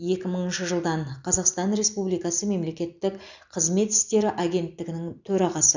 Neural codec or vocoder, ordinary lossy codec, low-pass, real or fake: none; none; 7.2 kHz; real